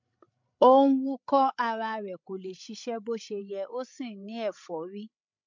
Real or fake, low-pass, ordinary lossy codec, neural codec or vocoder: fake; 7.2 kHz; MP3, 64 kbps; codec, 16 kHz, 16 kbps, FreqCodec, larger model